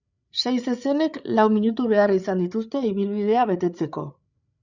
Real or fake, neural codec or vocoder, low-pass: fake; codec, 16 kHz, 8 kbps, FreqCodec, larger model; 7.2 kHz